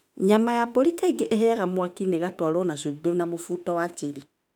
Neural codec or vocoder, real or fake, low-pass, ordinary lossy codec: autoencoder, 48 kHz, 32 numbers a frame, DAC-VAE, trained on Japanese speech; fake; 19.8 kHz; none